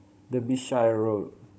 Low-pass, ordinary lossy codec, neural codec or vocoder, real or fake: none; none; codec, 16 kHz, 16 kbps, FunCodec, trained on Chinese and English, 50 frames a second; fake